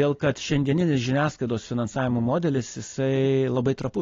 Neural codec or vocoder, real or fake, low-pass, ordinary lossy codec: none; real; 7.2 kHz; AAC, 32 kbps